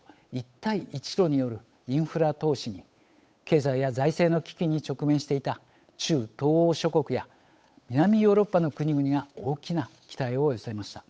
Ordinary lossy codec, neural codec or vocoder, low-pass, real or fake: none; codec, 16 kHz, 8 kbps, FunCodec, trained on Chinese and English, 25 frames a second; none; fake